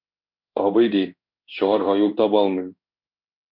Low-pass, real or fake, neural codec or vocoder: 5.4 kHz; fake; codec, 16 kHz in and 24 kHz out, 1 kbps, XY-Tokenizer